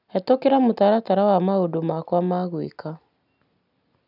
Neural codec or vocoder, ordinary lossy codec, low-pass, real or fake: none; none; 5.4 kHz; real